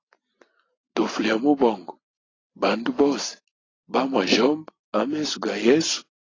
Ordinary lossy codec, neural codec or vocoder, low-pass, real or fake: AAC, 32 kbps; none; 7.2 kHz; real